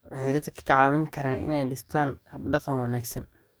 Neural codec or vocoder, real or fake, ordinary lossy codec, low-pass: codec, 44.1 kHz, 2.6 kbps, DAC; fake; none; none